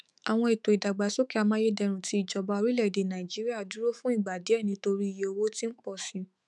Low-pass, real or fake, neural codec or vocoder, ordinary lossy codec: 10.8 kHz; fake; autoencoder, 48 kHz, 128 numbers a frame, DAC-VAE, trained on Japanese speech; none